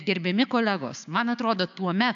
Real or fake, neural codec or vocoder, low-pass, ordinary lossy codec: fake; codec, 16 kHz, 6 kbps, DAC; 7.2 kHz; AAC, 64 kbps